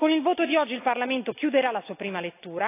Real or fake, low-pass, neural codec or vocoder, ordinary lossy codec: real; 3.6 kHz; none; AAC, 24 kbps